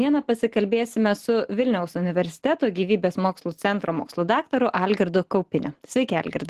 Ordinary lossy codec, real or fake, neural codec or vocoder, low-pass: Opus, 16 kbps; real; none; 14.4 kHz